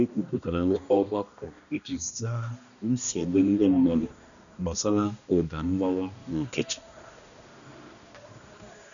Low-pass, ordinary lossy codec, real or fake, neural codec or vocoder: 7.2 kHz; none; fake; codec, 16 kHz, 1 kbps, X-Codec, HuBERT features, trained on balanced general audio